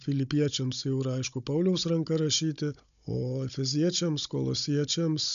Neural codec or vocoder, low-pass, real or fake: codec, 16 kHz, 16 kbps, FunCodec, trained on Chinese and English, 50 frames a second; 7.2 kHz; fake